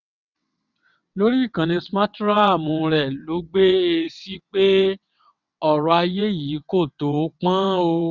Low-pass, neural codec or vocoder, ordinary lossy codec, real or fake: 7.2 kHz; vocoder, 22.05 kHz, 80 mel bands, WaveNeXt; none; fake